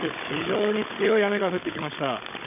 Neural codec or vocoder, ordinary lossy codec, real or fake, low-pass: codec, 16 kHz, 16 kbps, FunCodec, trained on Chinese and English, 50 frames a second; AAC, 32 kbps; fake; 3.6 kHz